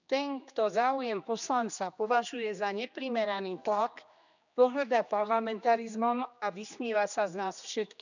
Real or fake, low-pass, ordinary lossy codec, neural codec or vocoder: fake; 7.2 kHz; none; codec, 16 kHz, 2 kbps, X-Codec, HuBERT features, trained on general audio